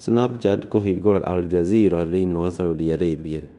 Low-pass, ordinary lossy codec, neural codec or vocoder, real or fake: 10.8 kHz; none; codec, 16 kHz in and 24 kHz out, 0.9 kbps, LongCat-Audio-Codec, fine tuned four codebook decoder; fake